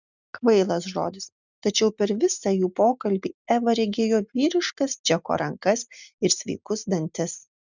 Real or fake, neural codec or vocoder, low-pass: real; none; 7.2 kHz